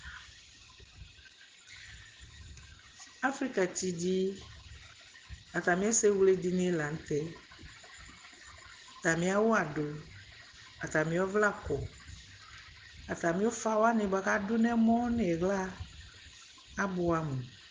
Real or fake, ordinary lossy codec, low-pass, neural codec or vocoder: real; Opus, 16 kbps; 7.2 kHz; none